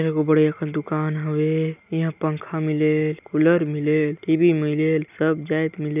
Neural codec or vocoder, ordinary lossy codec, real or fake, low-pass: none; none; real; 3.6 kHz